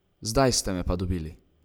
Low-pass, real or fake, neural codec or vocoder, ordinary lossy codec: none; real; none; none